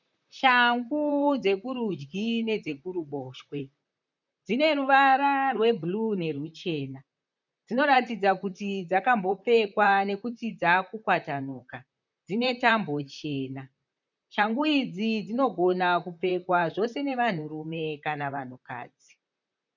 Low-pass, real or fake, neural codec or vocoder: 7.2 kHz; fake; vocoder, 44.1 kHz, 128 mel bands, Pupu-Vocoder